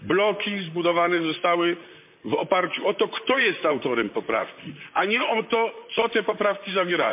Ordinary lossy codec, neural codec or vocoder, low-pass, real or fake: MP3, 32 kbps; vocoder, 44.1 kHz, 128 mel bands, Pupu-Vocoder; 3.6 kHz; fake